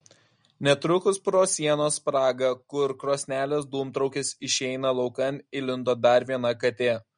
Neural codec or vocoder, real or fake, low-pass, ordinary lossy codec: none; real; 9.9 kHz; MP3, 48 kbps